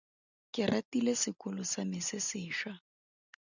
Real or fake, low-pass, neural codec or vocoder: real; 7.2 kHz; none